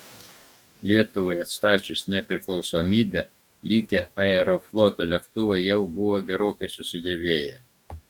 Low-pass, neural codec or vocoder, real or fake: 19.8 kHz; codec, 44.1 kHz, 2.6 kbps, DAC; fake